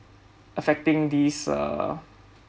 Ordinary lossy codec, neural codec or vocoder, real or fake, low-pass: none; none; real; none